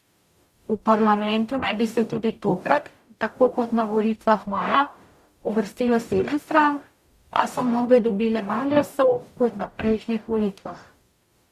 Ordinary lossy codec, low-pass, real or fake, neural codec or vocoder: Opus, 64 kbps; 14.4 kHz; fake; codec, 44.1 kHz, 0.9 kbps, DAC